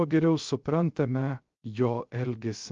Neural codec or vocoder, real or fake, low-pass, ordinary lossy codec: codec, 16 kHz, 0.3 kbps, FocalCodec; fake; 7.2 kHz; Opus, 32 kbps